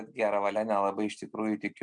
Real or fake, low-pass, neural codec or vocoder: real; 9.9 kHz; none